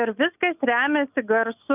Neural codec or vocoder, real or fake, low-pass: none; real; 3.6 kHz